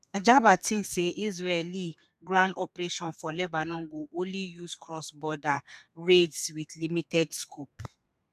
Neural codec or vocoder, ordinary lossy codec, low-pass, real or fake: codec, 32 kHz, 1.9 kbps, SNAC; none; 14.4 kHz; fake